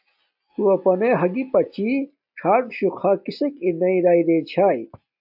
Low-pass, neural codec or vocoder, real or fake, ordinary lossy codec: 5.4 kHz; none; real; AAC, 48 kbps